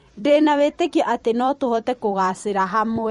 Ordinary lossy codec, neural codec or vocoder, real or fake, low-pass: MP3, 48 kbps; vocoder, 44.1 kHz, 128 mel bands every 256 samples, BigVGAN v2; fake; 19.8 kHz